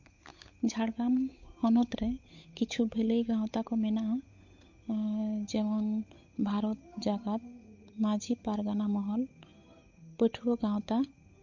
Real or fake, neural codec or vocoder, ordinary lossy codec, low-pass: fake; codec, 16 kHz, 8 kbps, FunCodec, trained on Chinese and English, 25 frames a second; MP3, 48 kbps; 7.2 kHz